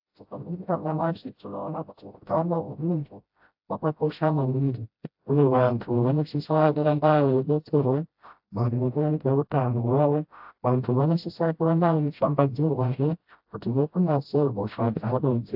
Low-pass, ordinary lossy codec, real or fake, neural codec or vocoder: 5.4 kHz; AAC, 48 kbps; fake; codec, 16 kHz, 0.5 kbps, FreqCodec, smaller model